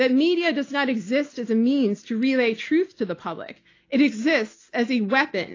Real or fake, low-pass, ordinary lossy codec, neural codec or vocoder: real; 7.2 kHz; AAC, 32 kbps; none